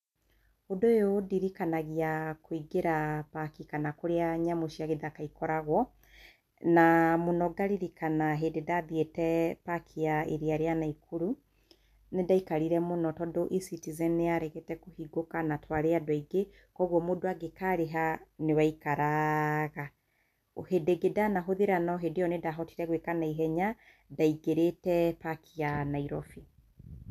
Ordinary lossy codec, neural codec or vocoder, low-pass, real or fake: none; none; 14.4 kHz; real